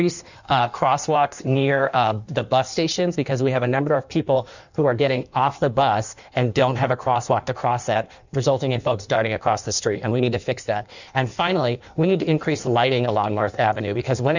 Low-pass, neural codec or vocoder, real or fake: 7.2 kHz; codec, 16 kHz in and 24 kHz out, 1.1 kbps, FireRedTTS-2 codec; fake